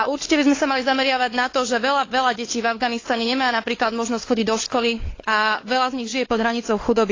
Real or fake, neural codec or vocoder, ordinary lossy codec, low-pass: fake; codec, 16 kHz, 4 kbps, FunCodec, trained on LibriTTS, 50 frames a second; AAC, 32 kbps; 7.2 kHz